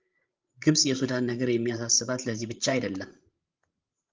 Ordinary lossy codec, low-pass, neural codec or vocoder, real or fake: Opus, 24 kbps; 7.2 kHz; codec, 16 kHz, 16 kbps, FreqCodec, larger model; fake